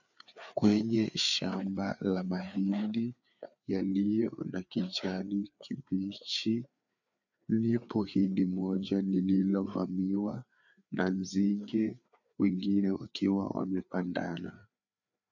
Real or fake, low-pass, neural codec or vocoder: fake; 7.2 kHz; codec, 16 kHz, 4 kbps, FreqCodec, larger model